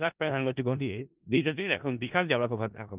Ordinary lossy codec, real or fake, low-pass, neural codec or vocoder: Opus, 24 kbps; fake; 3.6 kHz; codec, 16 kHz in and 24 kHz out, 0.4 kbps, LongCat-Audio-Codec, four codebook decoder